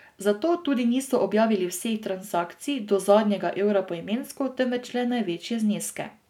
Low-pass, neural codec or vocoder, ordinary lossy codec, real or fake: 19.8 kHz; none; none; real